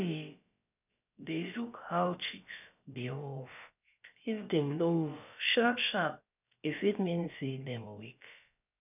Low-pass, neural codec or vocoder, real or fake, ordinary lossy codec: 3.6 kHz; codec, 16 kHz, about 1 kbps, DyCAST, with the encoder's durations; fake; none